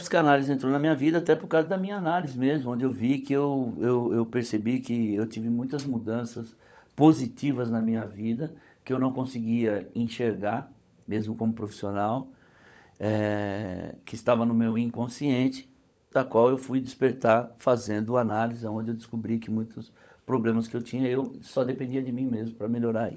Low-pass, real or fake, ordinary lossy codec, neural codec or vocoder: none; fake; none; codec, 16 kHz, 16 kbps, FunCodec, trained on LibriTTS, 50 frames a second